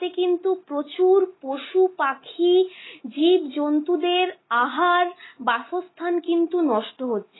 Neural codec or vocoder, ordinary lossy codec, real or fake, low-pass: none; AAC, 16 kbps; real; 7.2 kHz